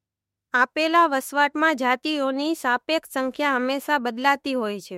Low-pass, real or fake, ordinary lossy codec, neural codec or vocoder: 19.8 kHz; fake; MP3, 64 kbps; autoencoder, 48 kHz, 32 numbers a frame, DAC-VAE, trained on Japanese speech